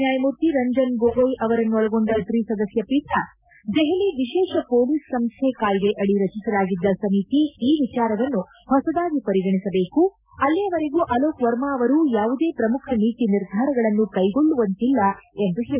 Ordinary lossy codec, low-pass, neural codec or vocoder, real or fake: none; 3.6 kHz; none; real